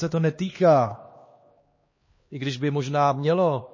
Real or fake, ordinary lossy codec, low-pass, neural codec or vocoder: fake; MP3, 32 kbps; 7.2 kHz; codec, 16 kHz, 2 kbps, X-Codec, HuBERT features, trained on LibriSpeech